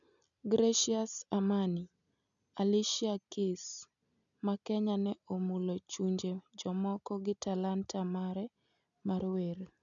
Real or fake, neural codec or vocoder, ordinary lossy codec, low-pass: real; none; none; 7.2 kHz